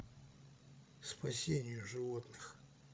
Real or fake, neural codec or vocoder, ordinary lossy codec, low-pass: fake; codec, 16 kHz, 16 kbps, FreqCodec, larger model; none; none